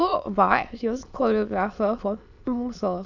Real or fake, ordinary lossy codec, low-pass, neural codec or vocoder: fake; none; 7.2 kHz; autoencoder, 22.05 kHz, a latent of 192 numbers a frame, VITS, trained on many speakers